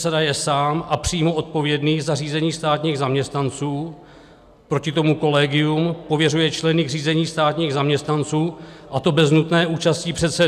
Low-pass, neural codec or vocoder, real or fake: 14.4 kHz; none; real